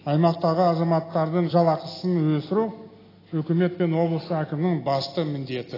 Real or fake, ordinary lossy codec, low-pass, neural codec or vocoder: real; AAC, 24 kbps; 5.4 kHz; none